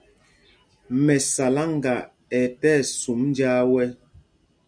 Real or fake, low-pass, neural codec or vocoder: real; 9.9 kHz; none